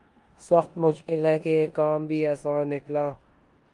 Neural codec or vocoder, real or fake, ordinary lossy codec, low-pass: codec, 16 kHz in and 24 kHz out, 0.9 kbps, LongCat-Audio-Codec, four codebook decoder; fake; Opus, 32 kbps; 10.8 kHz